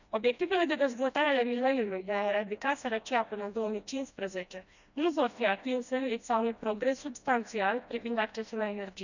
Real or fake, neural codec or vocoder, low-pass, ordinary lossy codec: fake; codec, 16 kHz, 1 kbps, FreqCodec, smaller model; 7.2 kHz; none